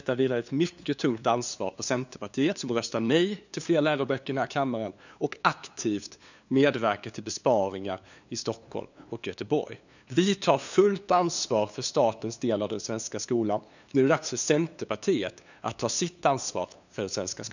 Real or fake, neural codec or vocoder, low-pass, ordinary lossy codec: fake; codec, 16 kHz, 2 kbps, FunCodec, trained on LibriTTS, 25 frames a second; 7.2 kHz; none